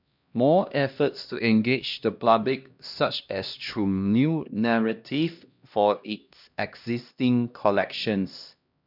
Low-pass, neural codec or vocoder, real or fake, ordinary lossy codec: 5.4 kHz; codec, 16 kHz, 1 kbps, X-Codec, HuBERT features, trained on LibriSpeech; fake; none